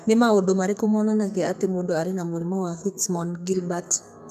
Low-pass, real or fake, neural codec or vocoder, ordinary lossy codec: 14.4 kHz; fake; codec, 32 kHz, 1.9 kbps, SNAC; none